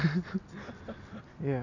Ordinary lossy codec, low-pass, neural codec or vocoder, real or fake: none; 7.2 kHz; none; real